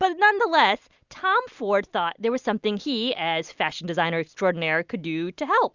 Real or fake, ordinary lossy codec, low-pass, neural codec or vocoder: real; Opus, 64 kbps; 7.2 kHz; none